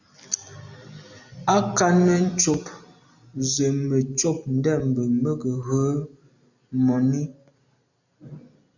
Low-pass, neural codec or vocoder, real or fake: 7.2 kHz; none; real